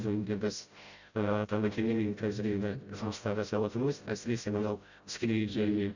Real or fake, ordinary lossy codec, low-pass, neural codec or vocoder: fake; Opus, 64 kbps; 7.2 kHz; codec, 16 kHz, 0.5 kbps, FreqCodec, smaller model